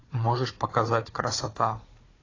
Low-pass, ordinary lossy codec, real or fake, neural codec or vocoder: 7.2 kHz; AAC, 32 kbps; fake; codec, 16 kHz, 4 kbps, FunCodec, trained on Chinese and English, 50 frames a second